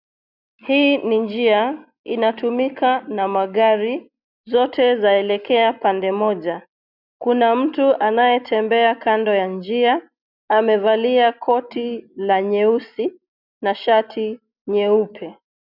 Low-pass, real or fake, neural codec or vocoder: 5.4 kHz; real; none